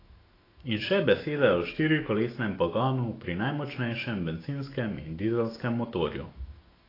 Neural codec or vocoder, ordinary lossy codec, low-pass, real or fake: autoencoder, 48 kHz, 128 numbers a frame, DAC-VAE, trained on Japanese speech; AAC, 24 kbps; 5.4 kHz; fake